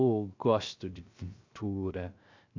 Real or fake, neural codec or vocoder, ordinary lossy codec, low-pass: fake; codec, 16 kHz, 0.3 kbps, FocalCodec; MP3, 64 kbps; 7.2 kHz